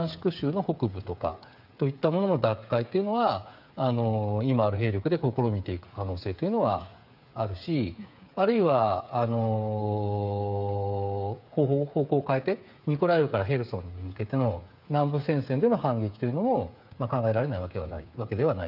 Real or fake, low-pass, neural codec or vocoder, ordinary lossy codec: fake; 5.4 kHz; codec, 16 kHz, 8 kbps, FreqCodec, smaller model; none